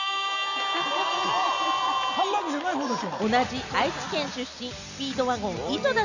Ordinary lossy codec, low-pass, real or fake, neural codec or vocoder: none; 7.2 kHz; real; none